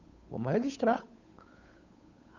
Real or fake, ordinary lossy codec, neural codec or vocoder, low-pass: fake; none; codec, 16 kHz, 8 kbps, FunCodec, trained on Chinese and English, 25 frames a second; 7.2 kHz